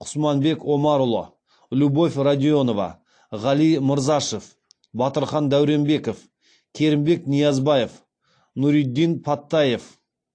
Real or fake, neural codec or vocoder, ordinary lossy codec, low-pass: real; none; AAC, 48 kbps; 9.9 kHz